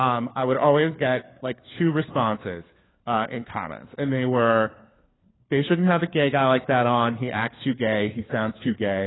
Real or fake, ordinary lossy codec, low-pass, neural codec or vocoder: fake; AAC, 16 kbps; 7.2 kHz; codec, 16 kHz, 4 kbps, FunCodec, trained on LibriTTS, 50 frames a second